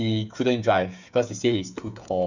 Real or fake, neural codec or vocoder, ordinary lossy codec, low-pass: fake; codec, 16 kHz, 8 kbps, FreqCodec, smaller model; none; 7.2 kHz